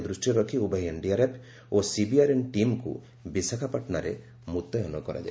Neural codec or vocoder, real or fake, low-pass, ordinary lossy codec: none; real; none; none